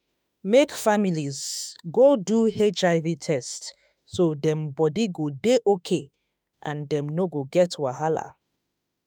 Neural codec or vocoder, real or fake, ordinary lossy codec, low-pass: autoencoder, 48 kHz, 32 numbers a frame, DAC-VAE, trained on Japanese speech; fake; none; none